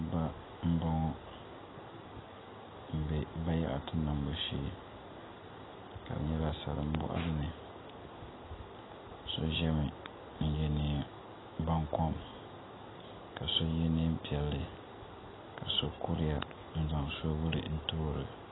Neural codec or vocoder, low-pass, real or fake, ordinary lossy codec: none; 7.2 kHz; real; AAC, 16 kbps